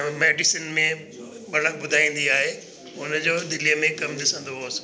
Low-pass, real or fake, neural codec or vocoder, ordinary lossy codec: none; real; none; none